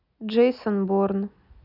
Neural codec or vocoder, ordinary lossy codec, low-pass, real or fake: none; none; 5.4 kHz; real